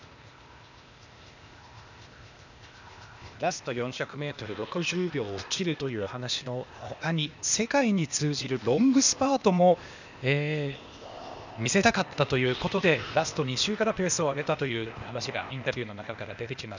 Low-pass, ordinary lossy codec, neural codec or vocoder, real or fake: 7.2 kHz; none; codec, 16 kHz, 0.8 kbps, ZipCodec; fake